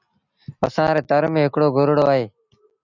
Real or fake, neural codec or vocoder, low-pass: real; none; 7.2 kHz